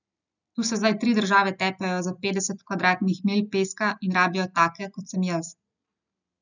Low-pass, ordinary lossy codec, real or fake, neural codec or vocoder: 7.2 kHz; none; real; none